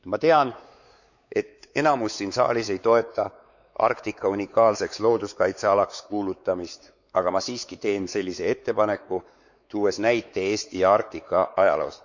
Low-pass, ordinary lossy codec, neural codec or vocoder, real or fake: 7.2 kHz; none; codec, 16 kHz, 4 kbps, X-Codec, WavLM features, trained on Multilingual LibriSpeech; fake